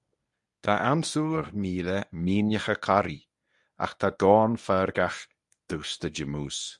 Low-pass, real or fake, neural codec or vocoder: 10.8 kHz; fake; codec, 24 kHz, 0.9 kbps, WavTokenizer, medium speech release version 1